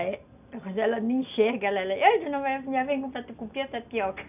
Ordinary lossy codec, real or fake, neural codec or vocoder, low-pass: none; real; none; 3.6 kHz